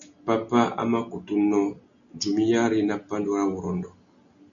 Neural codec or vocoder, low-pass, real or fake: none; 7.2 kHz; real